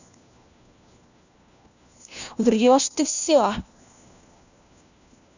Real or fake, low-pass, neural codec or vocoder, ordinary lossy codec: fake; 7.2 kHz; codec, 16 kHz, 1 kbps, FunCodec, trained on LibriTTS, 50 frames a second; none